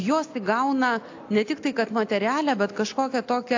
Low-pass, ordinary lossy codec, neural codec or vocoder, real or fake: 7.2 kHz; AAC, 48 kbps; none; real